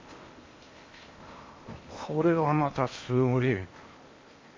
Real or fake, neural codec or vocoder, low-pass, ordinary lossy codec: fake; codec, 16 kHz in and 24 kHz out, 0.8 kbps, FocalCodec, streaming, 65536 codes; 7.2 kHz; MP3, 32 kbps